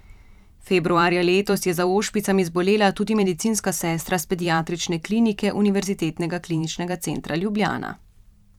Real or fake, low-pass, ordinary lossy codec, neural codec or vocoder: fake; 19.8 kHz; none; vocoder, 44.1 kHz, 128 mel bands every 512 samples, BigVGAN v2